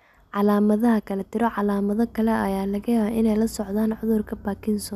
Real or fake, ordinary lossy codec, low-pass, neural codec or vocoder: real; none; 14.4 kHz; none